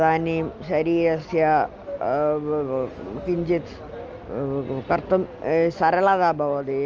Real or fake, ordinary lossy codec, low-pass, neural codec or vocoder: real; Opus, 24 kbps; 7.2 kHz; none